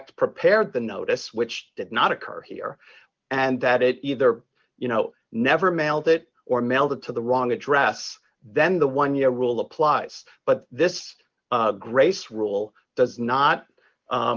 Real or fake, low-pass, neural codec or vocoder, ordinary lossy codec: real; 7.2 kHz; none; Opus, 24 kbps